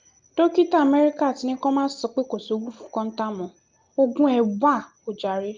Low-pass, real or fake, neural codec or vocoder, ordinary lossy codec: 7.2 kHz; real; none; Opus, 32 kbps